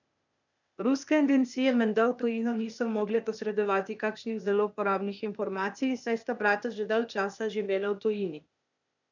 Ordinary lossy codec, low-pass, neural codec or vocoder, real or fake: none; 7.2 kHz; codec, 16 kHz, 0.8 kbps, ZipCodec; fake